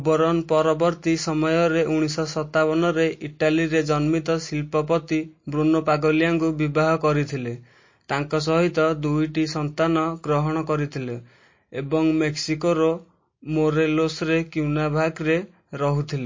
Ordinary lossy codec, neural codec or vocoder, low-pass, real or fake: MP3, 32 kbps; none; 7.2 kHz; real